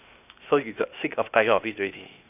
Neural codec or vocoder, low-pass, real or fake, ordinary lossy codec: codec, 16 kHz, 0.8 kbps, ZipCodec; 3.6 kHz; fake; none